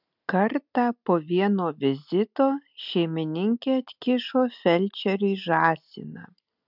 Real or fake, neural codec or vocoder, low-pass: real; none; 5.4 kHz